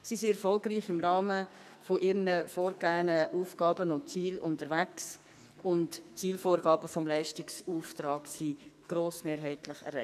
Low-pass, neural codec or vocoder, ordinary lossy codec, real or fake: 14.4 kHz; codec, 44.1 kHz, 2.6 kbps, SNAC; none; fake